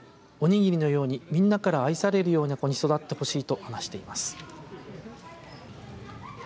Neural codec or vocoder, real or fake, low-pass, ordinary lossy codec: none; real; none; none